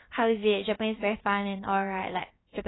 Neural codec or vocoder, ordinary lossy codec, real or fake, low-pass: codec, 16 kHz, about 1 kbps, DyCAST, with the encoder's durations; AAC, 16 kbps; fake; 7.2 kHz